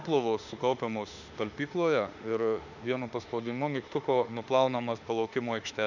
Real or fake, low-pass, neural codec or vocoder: fake; 7.2 kHz; autoencoder, 48 kHz, 32 numbers a frame, DAC-VAE, trained on Japanese speech